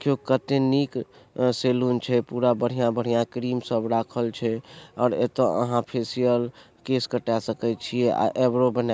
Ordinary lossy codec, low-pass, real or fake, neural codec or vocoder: none; none; real; none